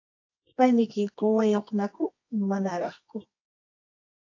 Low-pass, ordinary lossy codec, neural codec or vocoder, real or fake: 7.2 kHz; AAC, 48 kbps; codec, 24 kHz, 0.9 kbps, WavTokenizer, medium music audio release; fake